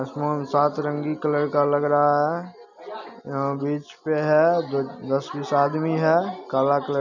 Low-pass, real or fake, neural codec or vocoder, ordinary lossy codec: 7.2 kHz; real; none; none